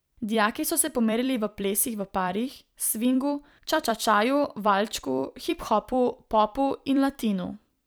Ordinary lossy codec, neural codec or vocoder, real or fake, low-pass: none; vocoder, 44.1 kHz, 128 mel bands every 256 samples, BigVGAN v2; fake; none